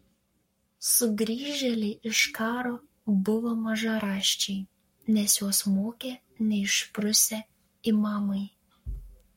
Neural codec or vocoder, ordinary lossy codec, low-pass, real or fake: codec, 44.1 kHz, 7.8 kbps, Pupu-Codec; MP3, 64 kbps; 19.8 kHz; fake